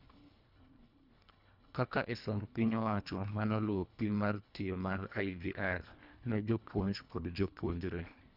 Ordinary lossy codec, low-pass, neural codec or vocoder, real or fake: none; 5.4 kHz; codec, 24 kHz, 1.5 kbps, HILCodec; fake